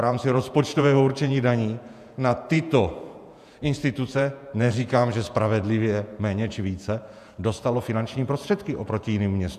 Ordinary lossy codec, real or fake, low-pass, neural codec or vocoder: AAC, 96 kbps; fake; 14.4 kHz; vocoder, 48 kHz, 128 mel bands, Vocos